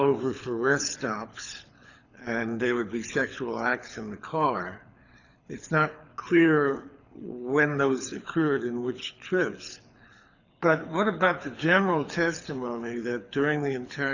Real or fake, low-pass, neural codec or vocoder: fake; 7.2 kHz; codec, 24 kHz, 6 kbps, HILCodec